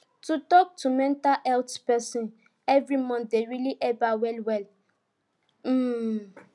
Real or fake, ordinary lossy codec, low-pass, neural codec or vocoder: real; none; 10.8 kHz; none